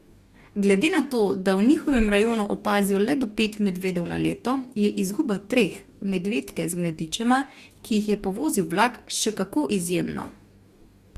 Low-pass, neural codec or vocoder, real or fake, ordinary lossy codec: 14.4 kHz; codec, 44.1 kHz, 2.6 kbps, DAC; fake; Opus, 64 kbps